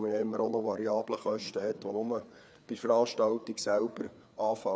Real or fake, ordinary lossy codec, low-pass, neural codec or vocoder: fake; none; none; codec, 16 kHz, 4 kbps, FreqCodec, larger model